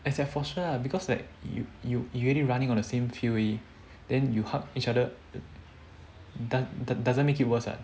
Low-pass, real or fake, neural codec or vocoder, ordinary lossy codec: none; real; none; none